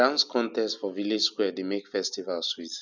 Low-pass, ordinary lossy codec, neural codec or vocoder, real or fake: 7.2 kHz; none; none; real